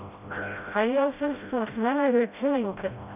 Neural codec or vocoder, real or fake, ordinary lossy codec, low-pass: codec, 16 kHz, 0.5 kbps, FreqCodec, smaller model; fake; none; 3.6 kHz